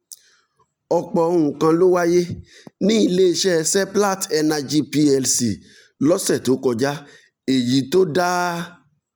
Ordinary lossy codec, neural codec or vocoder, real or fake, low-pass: none; none; real; none